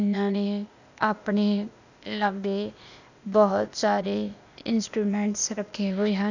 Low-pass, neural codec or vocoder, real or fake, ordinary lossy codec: 7.2 kHz; codec, 16 kHz, 0.8 kbps, ZipCodec; fake; none